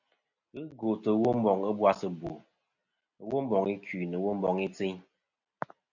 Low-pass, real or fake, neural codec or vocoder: 7.2 kHz; real; none